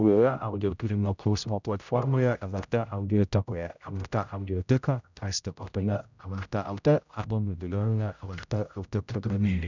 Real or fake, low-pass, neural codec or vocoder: fake; 7.2 kHz; codec, 16 kHz, 0.5 kbps, X-Codec, HuBERT features, trained on general audio